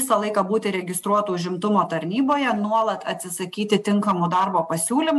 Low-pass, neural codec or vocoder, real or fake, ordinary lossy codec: 14.4 kHz; none; real; AAC, 96 kbps